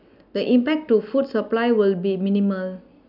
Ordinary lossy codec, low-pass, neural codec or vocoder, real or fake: none; 5.4 kHz; none; real